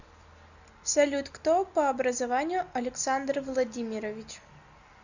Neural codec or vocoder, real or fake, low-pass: none; real; 7.2 kHz